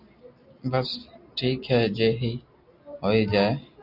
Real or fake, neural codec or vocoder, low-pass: real; none; 5.4 kHz